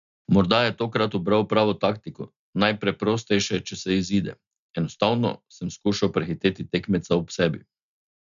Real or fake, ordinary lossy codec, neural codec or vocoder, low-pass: real; none; none; 7.2 kHz